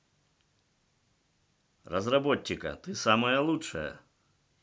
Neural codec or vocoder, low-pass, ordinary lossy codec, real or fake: none; none; none; real